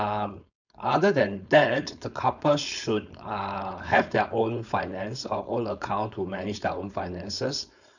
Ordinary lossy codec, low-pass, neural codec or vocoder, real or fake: none; 7.2 kHz; codec, 16 kHz, 4.8 kbps, FACodec; fake